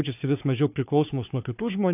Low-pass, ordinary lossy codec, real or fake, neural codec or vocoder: 3.6 kHz; AAC, 32 kbps; fake; codec, 16 kHz, 4 kbps, FunCodec, trained on Chinese and English, 50 frames a second